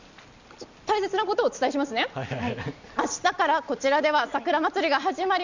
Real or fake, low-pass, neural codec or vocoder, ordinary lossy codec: real; 7.2 kHz; none; none